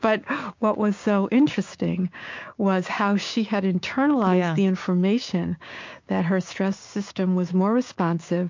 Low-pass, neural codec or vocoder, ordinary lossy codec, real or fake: 7.2 kHz; codec, 16 kHz, 6 kbps, DAC; MP3, 48 kbps; fake